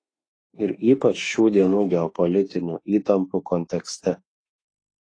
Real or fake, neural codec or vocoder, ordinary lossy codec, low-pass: fake; autoencoder, 48 kHz, 32 numbers a frame, DAC-VAE, trained on Japanese speech; AAC, 32 kbps; 9.9 kHz